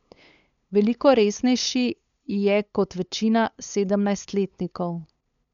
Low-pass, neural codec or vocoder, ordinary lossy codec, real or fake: 7.2 kHz; codec, 16 kHz, 8 kbps, FunCodec, trained on LibriTTS, 25 frames a second; none; fake